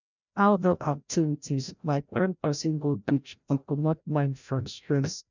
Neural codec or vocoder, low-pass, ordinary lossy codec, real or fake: codec, 16 kHz, 0.5 kbps, FreqCodec, larger model; 7.2 kHz; none; fake